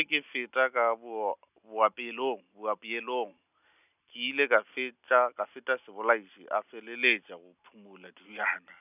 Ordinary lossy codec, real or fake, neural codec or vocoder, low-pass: none; real; none; 3.6 kHz